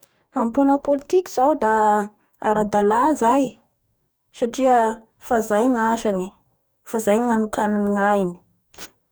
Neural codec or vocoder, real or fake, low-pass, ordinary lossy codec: codec, 44.1 kHz, 2.6 kbps, DAC; fake; none; none